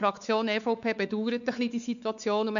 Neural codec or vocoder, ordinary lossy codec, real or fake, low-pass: codec, 16 kHz, 4 kbps, X-Codec, WavLM features, trained on Multilingual LibriSpeech; none; fake; 7.2 kHz